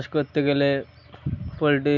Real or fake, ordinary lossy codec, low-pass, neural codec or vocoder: real; none; 7.2 kHz; none